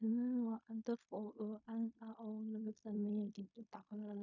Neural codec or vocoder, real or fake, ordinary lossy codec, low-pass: codec, 16 kHz in and 24 kHz out, 0.4 kbps, LongCat-Audio-Codec, fine tuned four codebook decoder; fake; none; 7.2 kHz